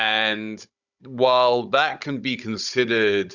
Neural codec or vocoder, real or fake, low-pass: codec, 16 kHz, 16 kbps, FunCodec, trained on Chinese and English, 50 frames a second; fake; 7.2 kHz